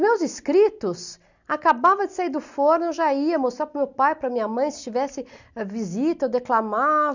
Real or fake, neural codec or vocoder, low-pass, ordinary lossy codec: real; none; 7.2 kHz; none